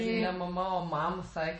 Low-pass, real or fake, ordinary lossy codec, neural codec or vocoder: 9.9 kHz; fake; MP3, 32 kbps; vocoder, 48 kHz, 128 mel bands, Vocos